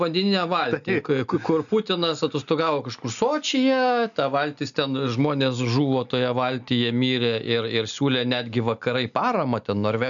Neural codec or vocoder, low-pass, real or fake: none; 7.2 kHz; real